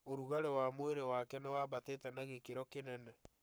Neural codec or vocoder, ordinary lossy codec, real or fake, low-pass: codec, 44.1 kHz, 7.8 kbps, Pupu-Codec; none; fake; none